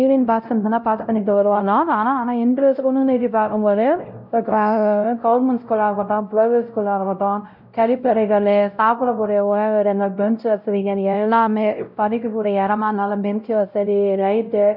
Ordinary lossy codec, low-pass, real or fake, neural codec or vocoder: none; 5.4 kHz; fake; codec, 16 kHz, 0.5 kbps, X-Codec, WavLM features, trained on Multilingual LibriSpeech